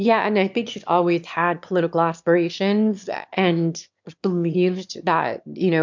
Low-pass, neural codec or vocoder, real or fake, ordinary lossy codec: 7.2 kHz; autoencoder, 22.05 kHz, a latent of 192 numbers a frame, VITS, trained on one speaker; fake; MP3, 64 kbps